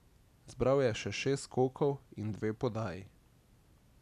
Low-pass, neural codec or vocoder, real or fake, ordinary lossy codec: 14.4 kHz; none; real; none